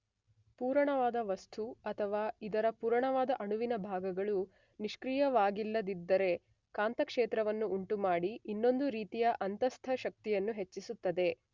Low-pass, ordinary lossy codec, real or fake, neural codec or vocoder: 7.2 kHz; Opus, 64 kbps; real; none